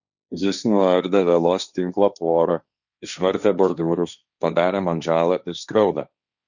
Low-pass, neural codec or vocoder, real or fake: 7.2 kHz; codec, 16 kHz, 1.1 kbps, Voila-Tokenizer; fake